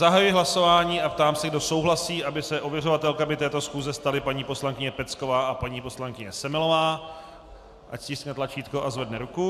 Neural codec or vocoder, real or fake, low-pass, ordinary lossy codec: none; real; 14.4 kHz; AAC, 96 kbps